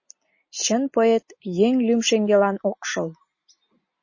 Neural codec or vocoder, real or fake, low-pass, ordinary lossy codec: none; real; 7.2 kHz; MP3, 32 kbps